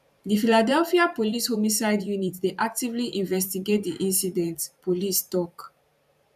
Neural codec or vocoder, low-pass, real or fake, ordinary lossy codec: vocoder, 44.1 kHz, 128 mel bands every 512 samples, BigVGAN v2; 14.4 kHz; fake; none